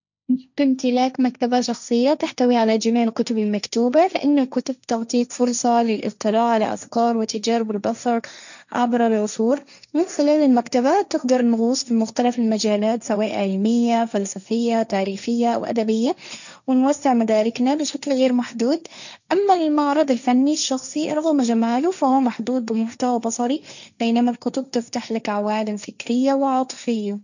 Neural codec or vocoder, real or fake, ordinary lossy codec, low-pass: codec, 16 kHz, 1.1 kbps, Voila-Tokenizer; fake; none; 7.2 kHz